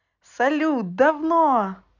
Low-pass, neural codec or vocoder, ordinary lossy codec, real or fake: 7.2 kHz; none; none; real